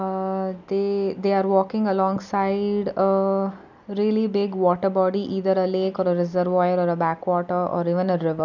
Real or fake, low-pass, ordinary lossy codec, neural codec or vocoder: real; 7.2 kHz; none; none